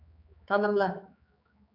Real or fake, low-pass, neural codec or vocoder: fake; 5.4 kHz; codec, 16 kHz, 4 kbps, X-Codec, HuBERT features, trained on general audio